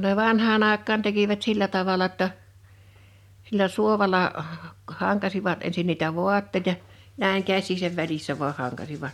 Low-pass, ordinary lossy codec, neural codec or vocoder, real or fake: 19.8 kHz; MP3, 96 kbps; none; real